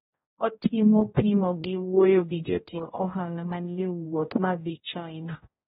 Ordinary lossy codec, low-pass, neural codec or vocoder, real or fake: AAC, 16 kbps; 7.2 kHz; codec, 16 kHz, 0.5 kbps, X-Codec, HuBERT features, trained on general audio; fake